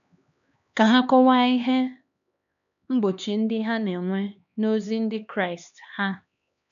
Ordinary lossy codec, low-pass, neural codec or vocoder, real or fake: none; 7.2 kHz; codec, 16 kHz, 2 kbps, X-Codec, HuBERT features, trained on LibriSpeech; fake